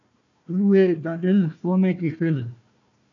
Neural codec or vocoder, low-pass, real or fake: codec, 16 kHz, 1 kbps, FunCodec, trained on Chinese and English, 50 frames a second; 7.2 kHz; fake